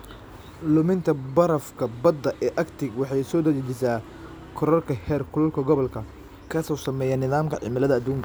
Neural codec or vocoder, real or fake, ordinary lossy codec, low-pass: none; real; none; none